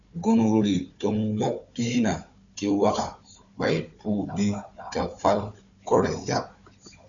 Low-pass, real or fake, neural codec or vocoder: 7.2 kHz; fake; codec, 16 kHz, 4 kbps, FunCodec, trained on Chinese and English, 50 frames a second